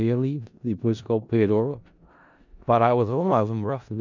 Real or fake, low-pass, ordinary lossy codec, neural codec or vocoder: fake; 7.2 kHz; none; codec, 16 kHz in and 24 kHz out, 0.4 kbps, LongCat-Audio-Codec, four codebook decoder